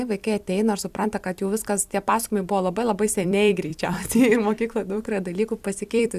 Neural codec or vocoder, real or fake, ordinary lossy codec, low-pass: vocoder, 44.1 kHz, 128 mel bands every 256 samples, BigVGAN v2; fake; Opus, 64 kbps; 14.4 kHz